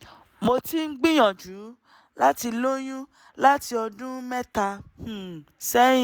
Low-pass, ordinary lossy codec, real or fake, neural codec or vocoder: none; none; real; none